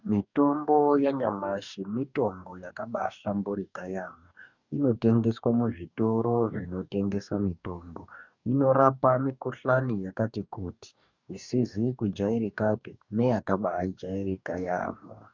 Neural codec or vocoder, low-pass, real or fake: codec, 44.1 kHz, 2.6 kbps, DAC; 7.2 kHz; fake